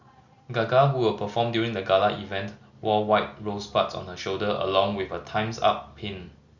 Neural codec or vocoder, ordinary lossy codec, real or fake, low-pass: none; Opus, 64 kbps; real; 7.2 kHz